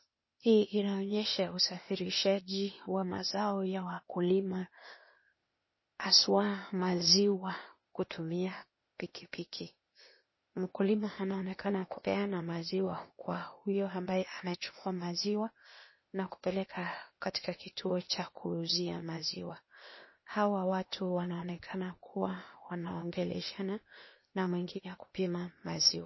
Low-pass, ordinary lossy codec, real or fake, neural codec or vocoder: 7.2 kHz; MP3, 24 kbps; fake; codec, 16 kHz, 0.8 kbps, ZipCodec